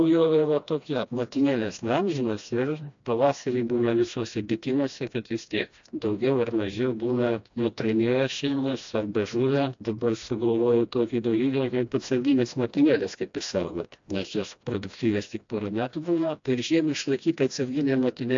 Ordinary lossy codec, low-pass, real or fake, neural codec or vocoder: AAC, 64 kbps; 7.2 kHz; fake; codec, 16 kHz, 1 kbps, FreqCodec, smaller model